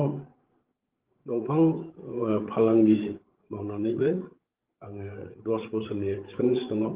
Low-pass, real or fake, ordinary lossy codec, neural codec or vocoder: 3.6 kHz; fake; Opus, 16 kbps; codec, 16 kHz, 8 kbps, FreqCodec, larger model